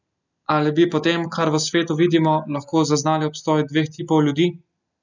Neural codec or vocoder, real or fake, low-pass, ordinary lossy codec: none; real; 7.2 kHz; none